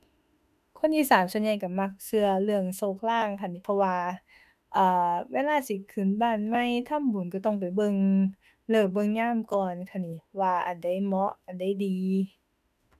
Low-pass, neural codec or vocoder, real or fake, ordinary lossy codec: 14.4 kHz; autoencoder, 48 kHz, 32 numbers a frame, DAC-VAE, trained on Japanese speech; fake; none